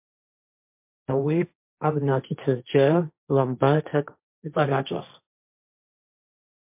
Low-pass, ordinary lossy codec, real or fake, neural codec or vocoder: 3.6 kHz; MP3, 32 kbps; fake; codec, 16 kHz, 1.1 kbps, Voila-Tokenizer